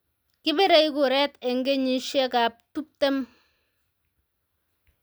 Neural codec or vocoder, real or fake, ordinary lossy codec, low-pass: none; real; none; none